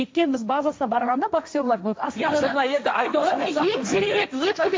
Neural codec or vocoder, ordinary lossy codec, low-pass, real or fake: codec, 16 kHz, 1.1 kbps, Voila-Tokenizer; none; none; fake